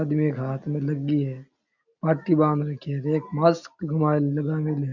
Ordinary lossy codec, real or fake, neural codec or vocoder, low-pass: none; real; none; 7.2 kHz